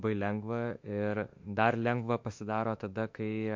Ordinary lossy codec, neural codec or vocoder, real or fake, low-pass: MP3, 48 kbps; autoencoder, 48 kHz, 32 numbers a frame, DAC-VAE, trained on Japanese speech; fake; 7.2 kHz